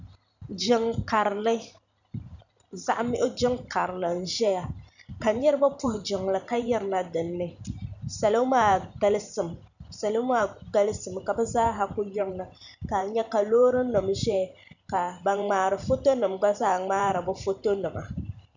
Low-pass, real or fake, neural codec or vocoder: 7.2 kHz; fake; vocoder, 44.1 kHz, 128 mel bands every 512 samples, BigVGAN v2